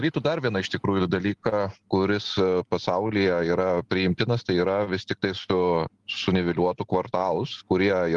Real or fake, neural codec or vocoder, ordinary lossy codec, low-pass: real; none; Opus, 24 kbps; 10.8 kHz